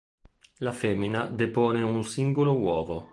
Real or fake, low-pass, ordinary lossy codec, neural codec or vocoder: real; 10.8 kHz; Opus, 24 kbps; none